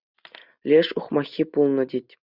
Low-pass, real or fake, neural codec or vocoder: 5.4 kHz; real; none